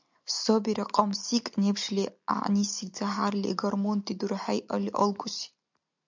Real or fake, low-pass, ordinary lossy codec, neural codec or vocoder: real; 7.2 kHz; MP3, 64 kbps; none